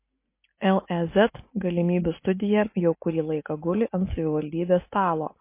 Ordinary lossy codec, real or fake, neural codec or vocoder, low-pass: MP3, 24 kbps; real; none; 3.6 kHz